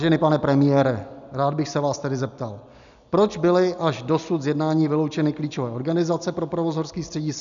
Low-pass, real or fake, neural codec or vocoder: 7.2 kHz; real; none